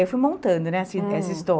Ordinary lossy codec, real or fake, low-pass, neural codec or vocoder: none; real; none; none